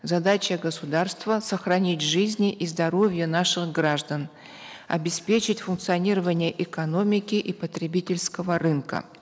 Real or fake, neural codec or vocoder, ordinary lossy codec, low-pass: real; none; none; none